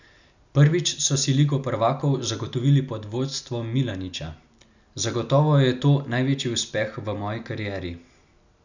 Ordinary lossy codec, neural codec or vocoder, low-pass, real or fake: none; none; 7.2 kHz; real